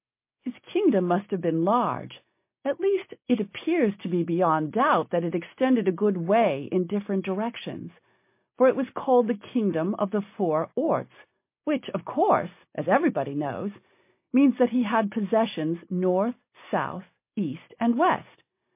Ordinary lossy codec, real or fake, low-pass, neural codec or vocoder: MP3, 24 kbps; real; 3.6 kHz; none